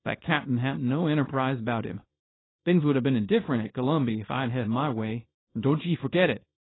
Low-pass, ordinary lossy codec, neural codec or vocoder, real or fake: 7.2 kHz; AAC, 16 kbps; codec, 24 kHz, 0.9 kbps, WavTokenizer, small release; fake